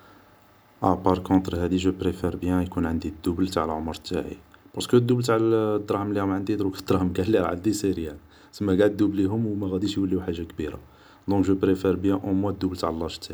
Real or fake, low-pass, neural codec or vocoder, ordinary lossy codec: real; none; none; none